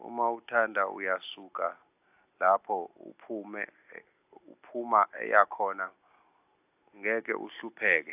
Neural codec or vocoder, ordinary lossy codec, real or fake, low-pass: none; AAC, 32 kbps; real; 3.6 kHz